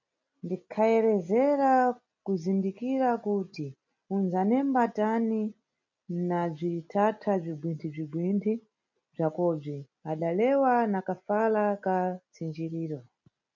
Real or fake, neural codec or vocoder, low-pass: real; none; 7.2 kHz